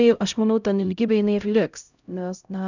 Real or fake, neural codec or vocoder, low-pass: fake; codec, 16 kHz, 0.5 kbps, X-Codec, HuBERT features, trained on LibriSpeech; 7.2 kHz